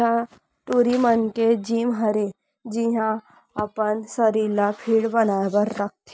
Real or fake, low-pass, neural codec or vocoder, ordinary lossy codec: real; none; none; none